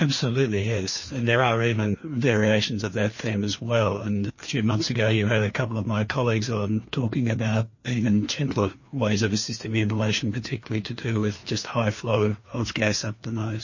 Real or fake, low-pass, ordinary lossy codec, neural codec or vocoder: fake; 7.2 kHz; MP3, 32 kbps; codec, 16 kHz, 2 kbps, FreqCodec, larger model